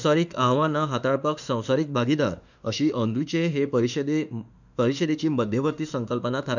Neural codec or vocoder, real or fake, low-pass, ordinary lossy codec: autoencoder, 48 kHz, 32 numbers a frame, DAC-VAE, trained on Japanese speech; fake; 7.2 kHz; none